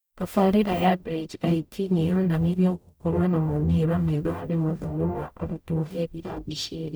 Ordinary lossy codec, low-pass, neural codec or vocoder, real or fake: none; none; codec, 44.1 kHz, 0.9 kbps, DAC; fake